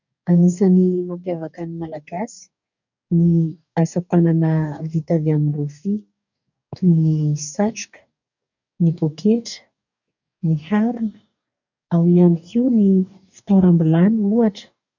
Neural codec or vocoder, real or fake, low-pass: codec, 44.1 kHz, 2.6 kbps, DAC; fake; 7.2 kHz